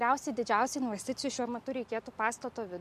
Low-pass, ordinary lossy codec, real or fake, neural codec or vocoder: 14.4 kHz; AAC, 96 kbps; real; none